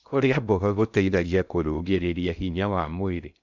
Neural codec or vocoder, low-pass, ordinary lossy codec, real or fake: codec, 16 kHz in and 24 kHz out, 0.6 kbps, FocalCodec, streaming, 2048 codes; 7.2 kHz; none; fake